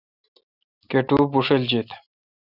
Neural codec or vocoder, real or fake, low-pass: none; real; 5.4 kHz